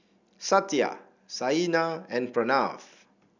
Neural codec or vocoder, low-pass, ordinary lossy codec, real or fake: none; 7.2 kHz; none; real